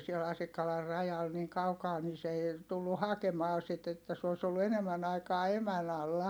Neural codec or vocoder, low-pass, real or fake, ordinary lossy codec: none; none; real; none